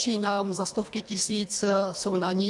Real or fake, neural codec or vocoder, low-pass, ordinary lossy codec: fake; codec, 24 kHz, 1.5 kbps, HILCodec; 10.8 kHz; AAC, 64 kbps